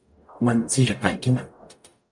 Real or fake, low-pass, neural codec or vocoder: fake; 10.8 kHz; codec, 44.1 kHz, 0.9 kbps, DAC